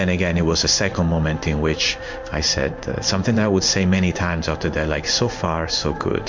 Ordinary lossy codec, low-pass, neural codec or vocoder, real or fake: MP3, 64 kbps; 7.2 kHz; codec, 16 kHz in and 24 kHz out, 1 kbps, XY-Tokenizer; fake